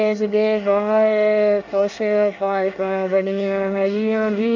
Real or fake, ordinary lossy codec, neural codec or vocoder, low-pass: fake; none; codec, 24 kHz, 1 kbps, SNAC; 7.2 kHz